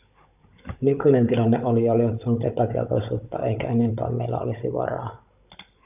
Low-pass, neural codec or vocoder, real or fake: 3.6 kHz; codec, 16 kHz, 16 kbps, FunCodec, trained on Chinese and English, 50 frames a second; fake